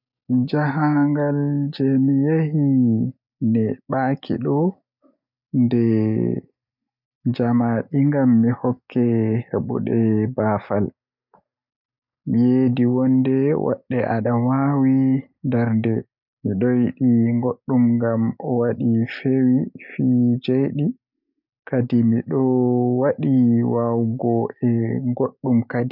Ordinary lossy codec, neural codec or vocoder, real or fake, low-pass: none; none; real; 5.4 kHz